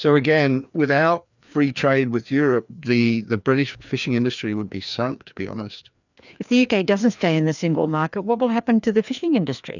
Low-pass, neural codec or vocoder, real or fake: 7.2 kHz; codec, 16 kHz, 2 kbps, FreqCodec, larger model; fake